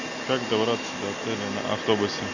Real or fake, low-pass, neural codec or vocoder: real; 7.2 kHz; none